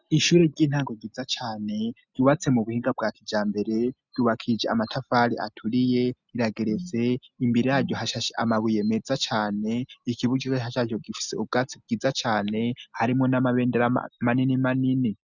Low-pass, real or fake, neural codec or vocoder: 7.2 kHz; real; none